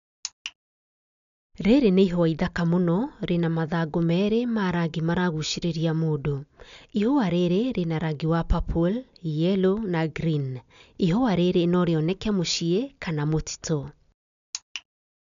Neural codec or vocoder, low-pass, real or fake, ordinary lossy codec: none; 7.2 kHz; real; none